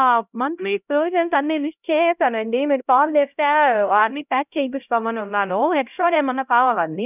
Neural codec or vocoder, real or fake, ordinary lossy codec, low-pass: codec, 16 kHz, 0.5 kbps, X-Codec, HuBERT features, trained on LibriSpeech; fake; none; 3.6 kHz